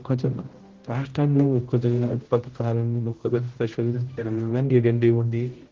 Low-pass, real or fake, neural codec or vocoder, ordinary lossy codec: 7.2 kHz; fake; codec, 16 kHz, 0.5 kbps, X-Codec, HuBERT features, trained on general audio; Opus, 16 kbps